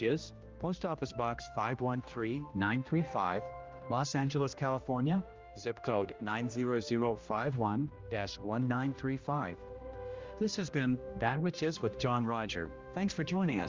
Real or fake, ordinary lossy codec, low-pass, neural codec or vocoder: fake; Opus, 32 kbps; 7.2 kHz; codec, 16 kHz, 1 kbps, X-Codec, HuBERT features, trained on general audio